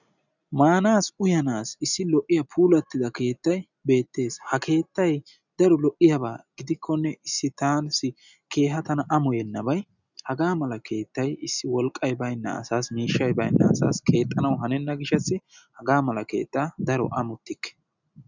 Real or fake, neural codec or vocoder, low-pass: real; none; 7.2 kHz